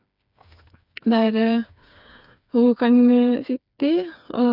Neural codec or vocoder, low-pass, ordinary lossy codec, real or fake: codec, 16 kHz, 4 kbps, FreqCodec, smaller model; 5.4 kHz; none; fake